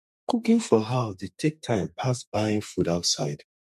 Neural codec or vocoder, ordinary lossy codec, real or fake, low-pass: codec, 32 kHz, 1.9 kbps, SNAC; MP3, 64 kbps; fake; 14.4 kHz